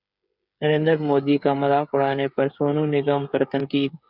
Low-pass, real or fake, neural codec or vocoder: 5.4 kHz; fake; codec, 16 kHz, 8 kbps, FreqCodec, smaller model